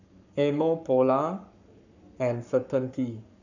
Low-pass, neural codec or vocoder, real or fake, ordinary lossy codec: 7.2 kHz; codec, 44.1 kHz, 7.8 kbps, Pupu-Codec; fake; none